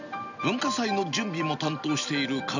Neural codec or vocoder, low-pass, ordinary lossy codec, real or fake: none; 7.2 kHz; none; real